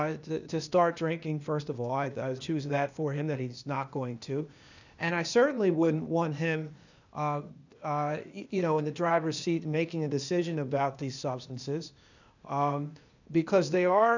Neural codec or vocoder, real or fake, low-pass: codec, 16 kHz, 0.8 kbps, ZipCodec; fake; 7.2 kHz